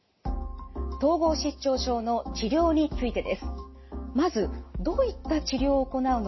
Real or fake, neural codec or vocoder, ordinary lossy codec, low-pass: real; none; MP3, 24 kbps; 7.2 kHz